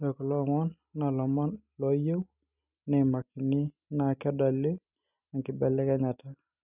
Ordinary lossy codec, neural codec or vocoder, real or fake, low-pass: none; none; real; 3.6 kHz